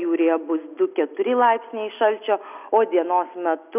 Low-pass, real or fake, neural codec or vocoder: 3.6 kHz; real; none